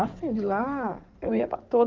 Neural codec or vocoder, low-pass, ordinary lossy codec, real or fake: codec, 16 kHz in and 24 kHz out, 2.2 kbps, FireRedTTS-2 codec; 7.2 kHz; Opus, 32 kbps; fake